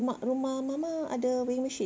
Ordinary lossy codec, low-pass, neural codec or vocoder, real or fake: none; none; none; real